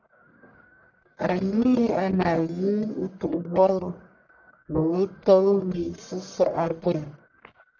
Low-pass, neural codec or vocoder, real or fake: 7.2 kHz; codec, 44.1 kHz, 1.7 kbps, Pupu-Codec; fake